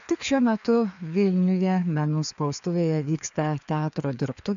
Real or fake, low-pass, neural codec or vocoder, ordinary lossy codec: fake; 7.2 kHz; codec, 16 kHz, 2 kbps, FreqCodec, larger model; AAC, 96 kbps